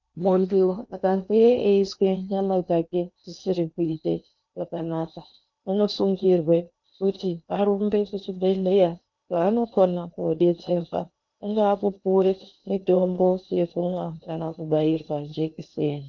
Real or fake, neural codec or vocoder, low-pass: fake; codec, 16 kHz in and 24 kHz out, 0.8 kbps, FocalCodec, streaming, 65536 codes; 7.2 kHz